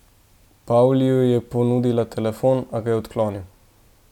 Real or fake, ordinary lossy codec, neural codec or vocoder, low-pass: real; none; none; 19.8 kHz